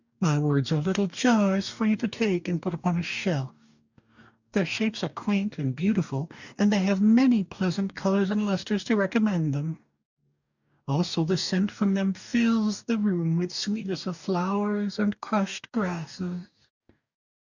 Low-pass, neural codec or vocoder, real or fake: 7.2 kHz; codec, 44.1 kHz, 2.6 kbps, DAC; fake